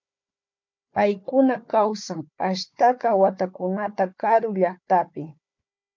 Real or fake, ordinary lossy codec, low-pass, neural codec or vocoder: fake; MP3, 64 kbps; 7.2 kHz; codec, 16 kHz, 4 kbps, FunCodec, trained on Chinese and English, 50 frames a second